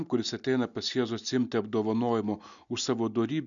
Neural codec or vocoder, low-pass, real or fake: none; 7.2 kHz; real